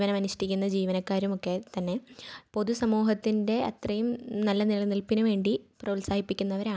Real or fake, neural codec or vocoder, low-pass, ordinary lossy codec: real; none; none; none